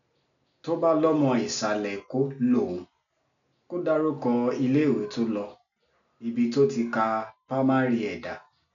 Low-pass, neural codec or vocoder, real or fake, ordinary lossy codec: 7.2 kHz; none; real; none